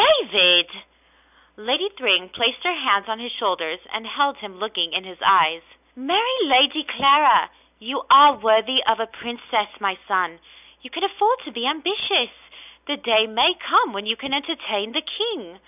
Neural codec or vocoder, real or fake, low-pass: none; real; 3.6 kHz